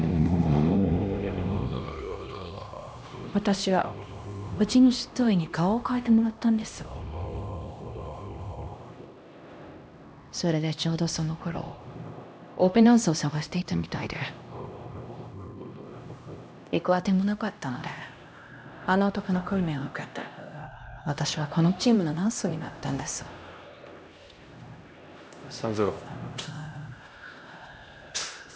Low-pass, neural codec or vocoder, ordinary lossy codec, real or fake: none; codec, 16 kHz, 1 kbps, X-Codec, HuBERT features, trained on LibriSpeech; none; fake